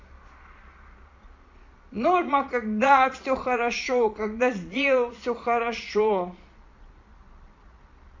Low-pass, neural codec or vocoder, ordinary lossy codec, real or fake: 7.2 kHz; vocoder, 22.05 kHz, 80 mel bands, Vocos; MP3, 48 kbps; fake